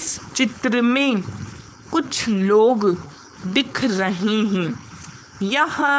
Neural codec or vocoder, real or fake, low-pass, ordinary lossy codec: codec, 16 kHz, 4.8 kbps, FACodec; fake; none; none